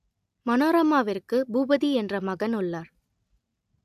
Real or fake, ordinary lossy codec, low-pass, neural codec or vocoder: real; AAC, 96 kbps; 14.4 kHz; none